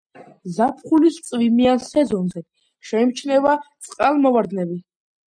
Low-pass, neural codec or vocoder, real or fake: 9.9 kHz; none; real